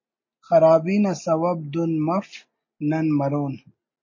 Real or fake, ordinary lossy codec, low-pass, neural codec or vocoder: real; MP3, 32 kbps; 7.2 kHz; none